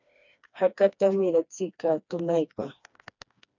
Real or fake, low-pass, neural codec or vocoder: fake; 7.2 kHz; codec, 16 kHz, 2 kbps, FreqCodec, smaller model